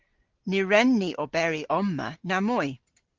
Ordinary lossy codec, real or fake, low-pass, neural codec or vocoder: Opus, 16 kbps; real; 7.2 kHz; none